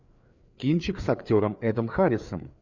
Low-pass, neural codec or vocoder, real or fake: 7.2 kHz; codec, 16 kHz, 4 kbps, FreqCodec, larger model; fake